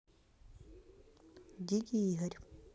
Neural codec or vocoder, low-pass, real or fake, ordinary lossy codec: none; none; real; none